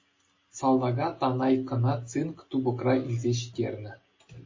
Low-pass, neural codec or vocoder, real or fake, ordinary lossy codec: 7.2 kHz; none; real; MP3, 32 kbps